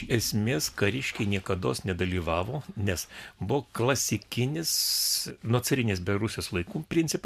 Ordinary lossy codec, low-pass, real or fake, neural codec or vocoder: MP3, 96 kbps; 14.4 kHz; fake; vocoder, 44.1 kHz, 128 mel bands every 512 samples, BigVGAN v2